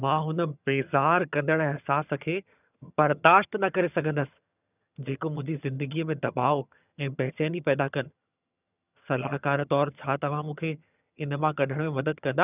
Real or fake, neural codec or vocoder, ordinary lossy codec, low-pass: fake; vocoder, 22.05 kHz, 80 mel bands, HiFi-GAN; none; 3.6 kHz